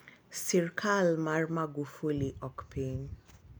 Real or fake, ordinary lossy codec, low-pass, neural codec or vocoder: real; none; none; none